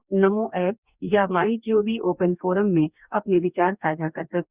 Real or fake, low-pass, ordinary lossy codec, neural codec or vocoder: fake; 3.6 kHz; Opus, 64 kbps; codec, 44.1 kHz, 2.6 kbps, DAC